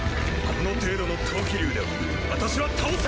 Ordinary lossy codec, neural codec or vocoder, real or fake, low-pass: none; none; real; none